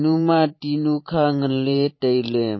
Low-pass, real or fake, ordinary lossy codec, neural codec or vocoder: 7.2 kHz; fake; MP3, 24 kbps; vocoder, 44.1 kHz, 128 mel bands every 512 samples, BigVGAN v2